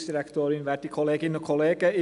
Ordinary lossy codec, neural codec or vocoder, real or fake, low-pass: none; none; real; 10.8 kHz